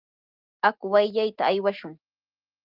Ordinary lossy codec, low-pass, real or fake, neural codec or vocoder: Opus, 32 kbps; 5.4 kHz; real; none